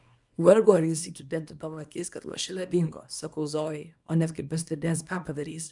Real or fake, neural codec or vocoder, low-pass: fake; codec, 24 kHz, 0.9 kbps, WavTokenizer, small release; 10.8 kHz